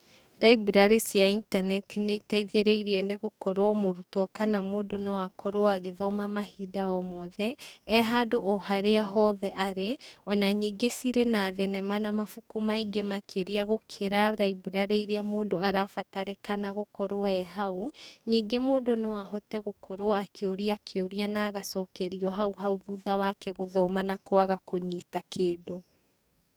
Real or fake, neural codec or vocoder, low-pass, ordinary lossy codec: fake; codec, 44.1 kHz, 2.6 kbps, DAC; none; none